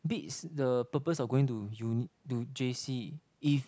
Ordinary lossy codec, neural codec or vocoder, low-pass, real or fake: none; none; none; real